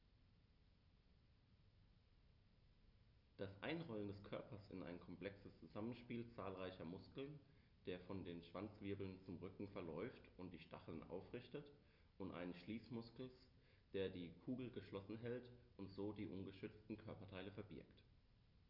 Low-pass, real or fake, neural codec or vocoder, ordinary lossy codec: 5.4 kHz; real; none; none